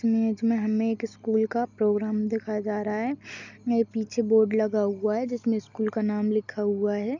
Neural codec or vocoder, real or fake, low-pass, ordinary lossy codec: none; real; 7.2 kHz; none